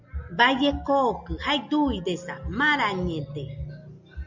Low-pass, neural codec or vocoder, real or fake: 7.2 kHz; none; real